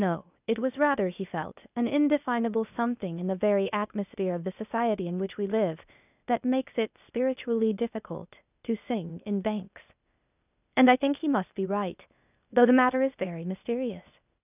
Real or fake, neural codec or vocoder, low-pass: fake; codec, 16 kHz, 0.8 kbps, ZipCodec; 3.6 kHz